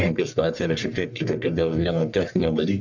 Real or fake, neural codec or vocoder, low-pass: fake; codec, 44.1 kHz, 1.7 kbps, Pupu-Codec; 7.2 kHz